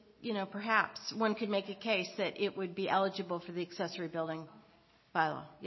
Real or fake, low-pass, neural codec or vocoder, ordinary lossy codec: real; 7.2 kHz; none; MP3, 24 kbps